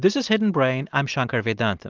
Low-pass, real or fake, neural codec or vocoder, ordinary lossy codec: 7.2 kHz; real; none; Opus, 32 kbps